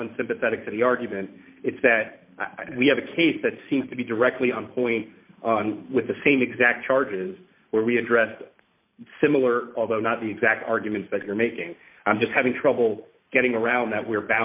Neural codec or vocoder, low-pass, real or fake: vocoder, 44.1 kHz, 128 mel bands every 512 samples, BigVGAN v2; 3.6 kHz; fake